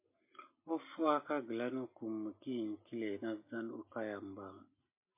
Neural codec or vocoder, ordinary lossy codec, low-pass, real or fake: none; MP3, 24 kbps; 3.6 kHz; real